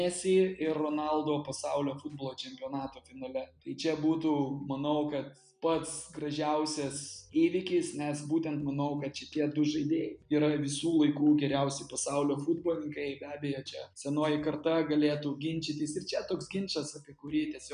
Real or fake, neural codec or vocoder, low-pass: real; none; 9.9 kHz